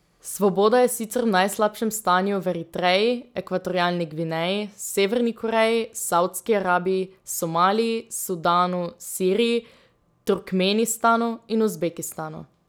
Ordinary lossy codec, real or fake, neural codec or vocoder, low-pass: none; real; none; none